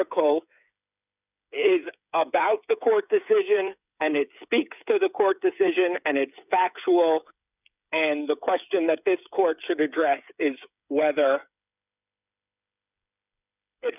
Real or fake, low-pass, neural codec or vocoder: fake; 3.6 kHz; codec, 16 kHz, 8 kbps, FreqCodec, smaller model